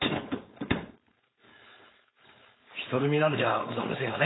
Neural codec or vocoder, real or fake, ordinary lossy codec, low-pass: codec, 16 kHz, 4.8 kbps, FACodec; fake; AAC, 16 kbps; 7.2 kHz